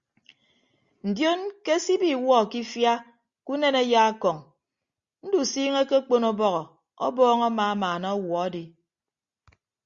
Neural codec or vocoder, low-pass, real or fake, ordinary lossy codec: none; 7.2 kHz; real; Opus, 64 kbps